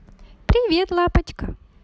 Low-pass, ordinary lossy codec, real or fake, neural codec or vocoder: none; none; real; none